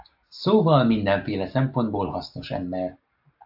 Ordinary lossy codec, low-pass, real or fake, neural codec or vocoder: AAC, 48 kbps; 5.4 kHz; real; none